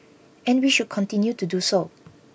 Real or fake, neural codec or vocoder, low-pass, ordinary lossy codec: real; none; none; none